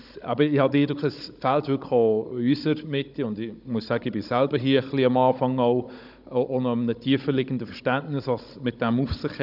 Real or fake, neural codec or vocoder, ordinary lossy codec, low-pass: fake; codec, 16 kHz, 16 kbps, FunCodec, trained on Chinese and English, 50 frames a second; none; 5.4 kHz